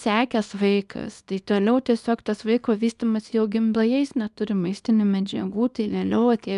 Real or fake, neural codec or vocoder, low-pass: fake; codec, 24 kHz, 0.9 kbps, WavTokenizer, small release; 10.8 kHz